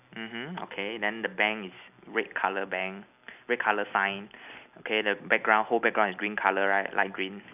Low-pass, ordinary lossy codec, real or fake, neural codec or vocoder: 3.6 kHz; none; real; none